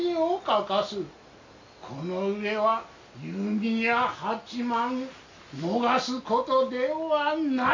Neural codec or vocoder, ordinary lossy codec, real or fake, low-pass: none; MP3, 64 kbps; real; 7.2 kHz